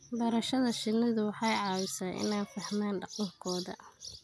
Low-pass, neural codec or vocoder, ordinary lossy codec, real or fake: none; none; none; real